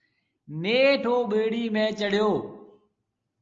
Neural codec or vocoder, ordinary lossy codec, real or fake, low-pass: none; Opus, 24 kbps; real; 7.2 kHz